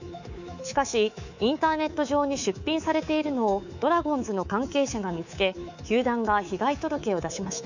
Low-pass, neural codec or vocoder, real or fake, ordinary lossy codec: 7.2 kHz; codec, 24 kHz, 3.1 kbps, DualCodec; fake; none